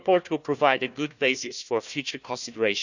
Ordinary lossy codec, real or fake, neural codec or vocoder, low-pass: none; fake; codec, 16 kHz, 1 kbps, FunCodec, trained on Chinese and English, 50 frames a second; 7.2 kHz